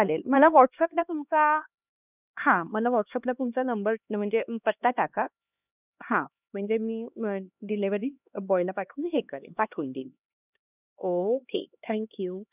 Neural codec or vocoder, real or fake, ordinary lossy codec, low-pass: codec, 16 kHz, 2 kbps, X-Codec, HuBERT features, trained on LibriSpeech; fake; none; 3.6 kHz